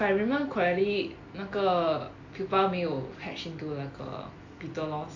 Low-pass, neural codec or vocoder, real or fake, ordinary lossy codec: 7.2 kHz; none; real; AAC, 48 kbps